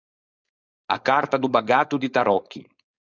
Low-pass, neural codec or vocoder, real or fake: 7.2 kHz; codec, 16 kHz, 4.8 kbps, FACodec; fake